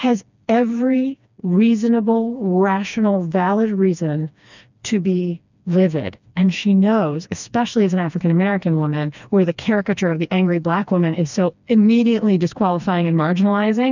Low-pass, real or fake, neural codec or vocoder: 7.2 kHz; fake; codec, 16 kHz, 2 kbps, FreqCodec, smaller model